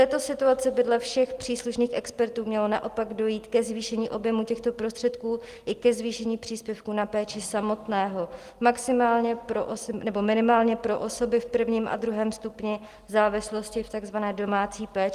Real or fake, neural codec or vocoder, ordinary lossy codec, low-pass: real; none; Opus, 24 kbps; 14.4 kHz